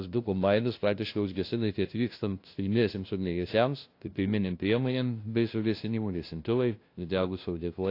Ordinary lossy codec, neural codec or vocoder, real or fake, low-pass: AAC, 32 kbps; codec, 16 kHz, 0.5 kbps, FunCodec, trained on LibriTTS, 25 frames a second; fake; 5.4 kHz